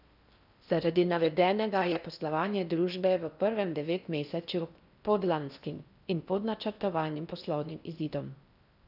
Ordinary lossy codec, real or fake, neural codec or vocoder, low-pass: none; fake; codec, 16 kHz in and 24 kHz out, 0.6 kbps, FocalCodec, streaming, 4096 codes; 5.4 kHz